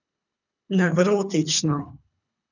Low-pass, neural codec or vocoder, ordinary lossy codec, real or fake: 7.2 kHz; codec, 24 kHz, 3 kbps, HILCodec; none; fake